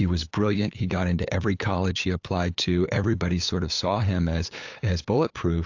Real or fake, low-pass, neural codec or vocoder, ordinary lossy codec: fake; 7.2 kHz; codec, 16 kHz, 8 kbps, FunCodec, trained on LibriTTS, 25 frames a second; AAC, 48 kbps